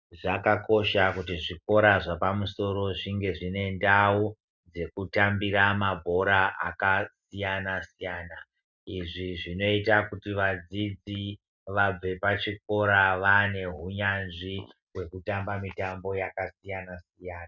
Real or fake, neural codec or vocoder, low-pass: real; none; 7.2 kHz